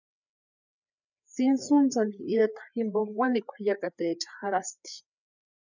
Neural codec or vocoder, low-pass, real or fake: codec, 16 kHz, 4 kbps, FreqCodec, larger model; 7.2 kHz; fake